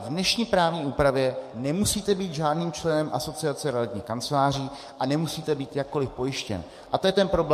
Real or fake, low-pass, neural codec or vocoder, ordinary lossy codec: fake; 14.4 kHz; codec, 44.1 kHz, 7.8 kbps, DAC; MP3, 64 kbps